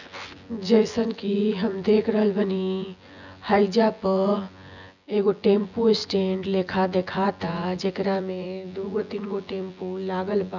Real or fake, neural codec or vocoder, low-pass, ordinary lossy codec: fake; vocoder, 24 kHz, 100 mel bands, Vocos; 7.2 kHz; none